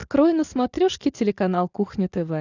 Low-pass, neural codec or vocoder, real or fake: 7.2 kHz; none; real